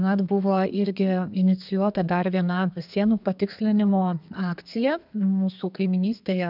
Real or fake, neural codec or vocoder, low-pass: fake; codec, 32 kHz, 1.9 kbps, SNAC; 5.4 kHz